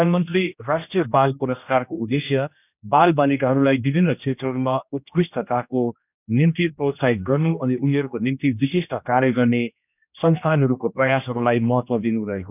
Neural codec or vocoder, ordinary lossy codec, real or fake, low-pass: codec, 16 kHz, 1 kbps, X-Codec, HuBERT features, trained on general audio; none; fake; 3.6 kHz